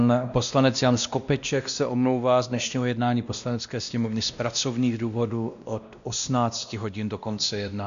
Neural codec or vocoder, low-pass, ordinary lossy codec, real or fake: codec, 16 kHz, 1 kbps, X-Codec, WavLM features, trained on Multilingual LibriSpeech; 7.2 kHz; MP3, 96 kbps; fake